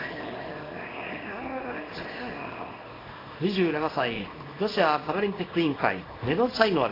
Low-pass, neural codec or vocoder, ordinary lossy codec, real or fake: 5.4 kHz; codec, 24 kHz, 0.9 kbps, WavTokenizer, small release; AAC, 24 kbps; fake